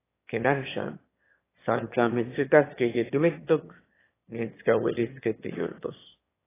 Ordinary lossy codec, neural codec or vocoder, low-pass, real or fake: AAC, 16 kbps; autoencoder, 22.05 kHz, a latent of 192 numbers a frame, VITS, trained on one speaker; 3.6 kHz; fake